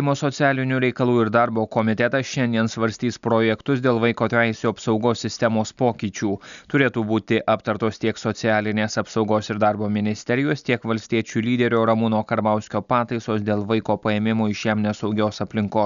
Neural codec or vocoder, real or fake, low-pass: none; real; 7.2 kHz